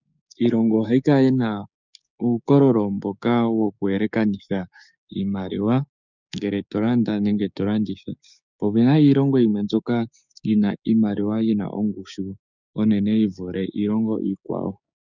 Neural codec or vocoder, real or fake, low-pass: codec, 16 kHz, 6 kbps, DAC; fake; 7.2 kHz